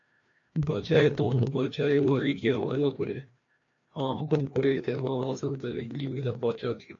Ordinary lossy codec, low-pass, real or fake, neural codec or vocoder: AAC, 48 kbps; 7.2 kHz; fake; codec, 16 kHz, 1 kbps, FreqCodec, larger model